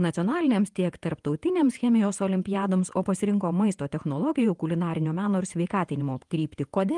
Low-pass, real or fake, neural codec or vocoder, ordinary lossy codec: 10.8 kHz; fake; vocoder, 48 kHz, 128 mel bands, Vocos; Opus, 32 kbps